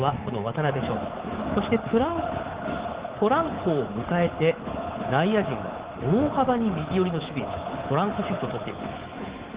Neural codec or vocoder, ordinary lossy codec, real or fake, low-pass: codec, 24 kHz, 3.1 kbps, DualCodec; Opus, 16 kbps; fake; 3.6 kHz